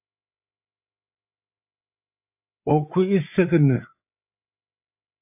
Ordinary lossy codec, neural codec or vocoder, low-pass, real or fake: AAC, 32 kbps; codec, 16 kHz, 8 kbps, FreqCodec, larger model; 3.6 kHz; fake